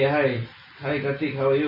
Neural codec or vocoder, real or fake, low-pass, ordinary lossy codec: none; real; 5.4 kHz; AAC, 32 kbps